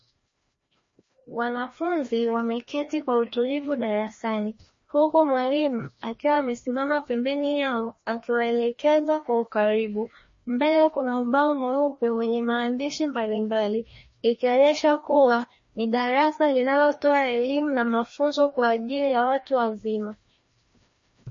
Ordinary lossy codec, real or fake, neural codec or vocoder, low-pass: MP3, 32 kbps; fake; codec, 16 kHz, 1 kbps, FreqCodec, larger model; 7.2 kHz